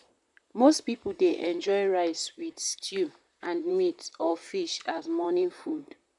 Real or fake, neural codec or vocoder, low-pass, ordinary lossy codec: fake; vocoder, 44.1 kHz, 128 mel bands, Pupu-Vocoder; 10.8 kHz; none